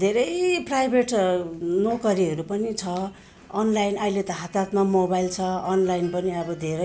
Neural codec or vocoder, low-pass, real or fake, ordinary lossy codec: none; none; real; none